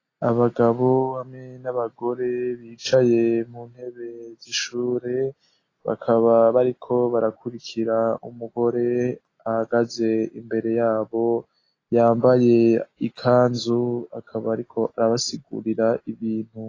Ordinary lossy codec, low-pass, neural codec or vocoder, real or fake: AAC, 32 kbps; 7.2 kHz; none; real